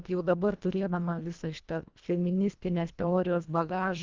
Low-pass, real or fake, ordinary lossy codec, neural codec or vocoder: 7.2 kHz; fake; Opus, 32 kbps; codec, 24 kHz, 1.5 kbps, HILCodec